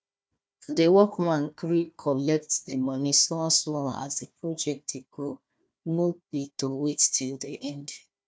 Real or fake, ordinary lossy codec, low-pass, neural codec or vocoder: fake; none; none; codec, 16 kHz, 1 kbps, FunCodec, trained on Chinese and English, 50 frames a second